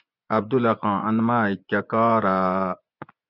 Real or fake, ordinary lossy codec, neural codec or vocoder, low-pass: fake; Opus, 64 kbps; vocoder, 24 kHz, 100 mel bands, Vocos; 5.4 kHz